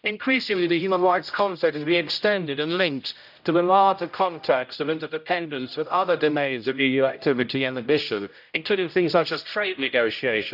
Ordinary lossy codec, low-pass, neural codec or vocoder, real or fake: none; 5.4 kHz; codec, 16 kHz, 0.5 kbps, X-Codec, HuBERT features, trained on general audio; fake